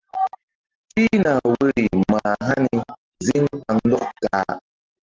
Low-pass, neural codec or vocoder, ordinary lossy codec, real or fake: 7.2 kHz; none; Opus, 16 kbps; real